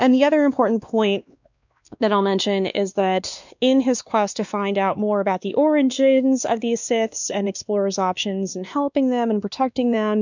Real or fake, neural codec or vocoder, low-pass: fake; codec, 16 kHz, 2 kbps, X-Codec, WavLM features, trained on Multilingual LibriSpeech; 7.2 kHz